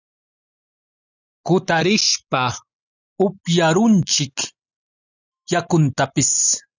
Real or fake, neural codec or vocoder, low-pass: real; none; 7.2 kHz